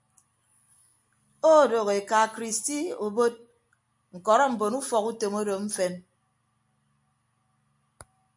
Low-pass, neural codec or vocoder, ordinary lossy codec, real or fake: 10.8 kHz; none; MP3, 96 kbps; real